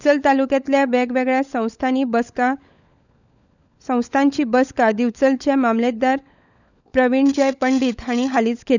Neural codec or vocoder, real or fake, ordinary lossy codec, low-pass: codec, 16 kHz, 16 kbps, FunCodec, trained on LibriTTS, 50 frames a second; fake; none; 7.2 kHz